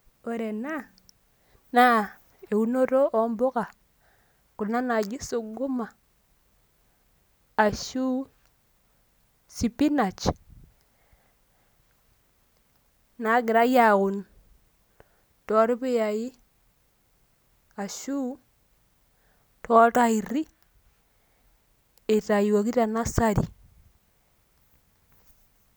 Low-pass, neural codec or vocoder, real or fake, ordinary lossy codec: none; none; real; none